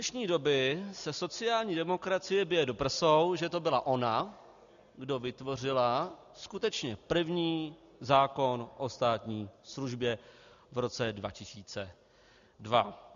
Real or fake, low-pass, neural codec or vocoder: real; 7.2 kHz; none